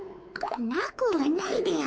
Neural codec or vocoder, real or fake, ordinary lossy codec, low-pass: codec, 16 kHz, 4 kbps, X-Codec, WavLM features, trained on Multilingual LibriSpeech; fake; none; none